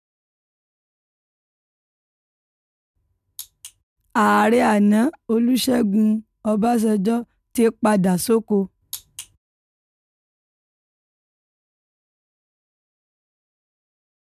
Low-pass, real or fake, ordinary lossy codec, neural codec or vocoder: 14.4 kHz; real; none; none